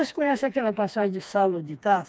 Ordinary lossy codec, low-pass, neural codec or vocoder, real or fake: none; none; codec, 16 kHz, 2 kbps, FreqCodec, smaller model; fake